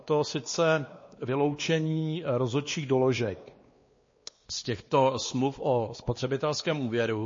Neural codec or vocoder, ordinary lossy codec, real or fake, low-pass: codec, 16 kHz, 2 kbps, X-Codec, WavLM features, trained on Multilingual LibriSpeech; MP3, 32 kbps; fake; 7.2 kHz